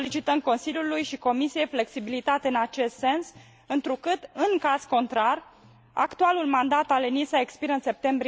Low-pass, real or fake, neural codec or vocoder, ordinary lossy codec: none; real; none; none